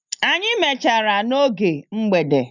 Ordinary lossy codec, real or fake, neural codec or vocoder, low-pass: none; real; none; 7.2 kHz